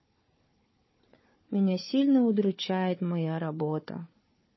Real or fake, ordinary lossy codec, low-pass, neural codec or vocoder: fake; MP3, 24 kbps; 7.2 kHz; codec, 16 kHz, 4 kbps, FunCodec, trained on Chinese and English, 50 frames a second